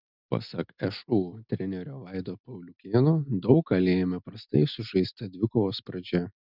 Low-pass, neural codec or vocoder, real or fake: 5.4 kHz; none; real